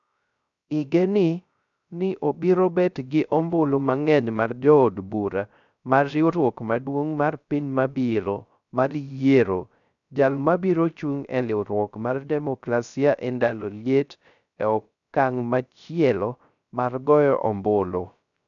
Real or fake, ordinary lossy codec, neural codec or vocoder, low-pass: fake; none; codec, 16 kHz, 0.3 kbps, FocalCodec; 7.2 kHz